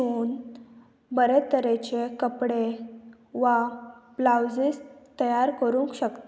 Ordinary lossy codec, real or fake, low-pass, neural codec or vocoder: none; real; none; none